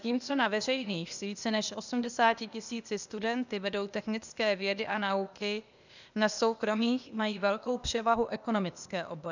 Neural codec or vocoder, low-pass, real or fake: codec, 16 kHz, 0.8 kbps, ZipCodec; 7.2 kHz; fake